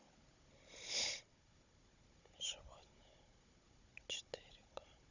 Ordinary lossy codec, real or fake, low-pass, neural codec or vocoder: none; fake; 7.2 kHz; codec, 16 kHz, 16 kbps, FunCodec, trained on Chinese and English, 50 frames a second